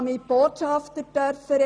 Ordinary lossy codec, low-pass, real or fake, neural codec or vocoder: none; 9.9 kHz; real; none